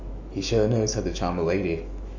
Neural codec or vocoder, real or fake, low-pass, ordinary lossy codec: autoencoder, 48 kHz, 128 numbers a frame, DAC-VAE, trained on Japanese speech; fake; 7.2 kHz; AAC, 48 kbps